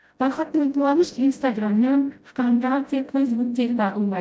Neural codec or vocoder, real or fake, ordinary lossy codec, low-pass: codec, 16 kHz, 0.5 kbps, FreqCodec, smaller model; fake; none; none